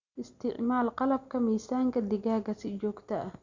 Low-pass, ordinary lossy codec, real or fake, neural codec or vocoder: 7.2 kHz; Opus, 64 kbps; real; none